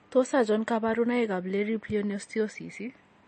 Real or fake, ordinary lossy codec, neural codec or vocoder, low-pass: real; MP3, 32 kbps; none; 10.8 kHz